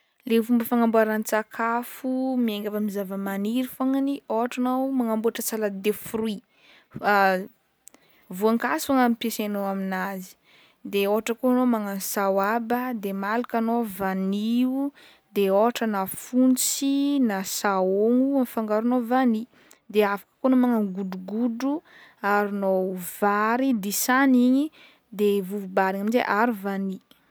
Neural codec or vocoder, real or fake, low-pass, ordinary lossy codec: none; real; none; none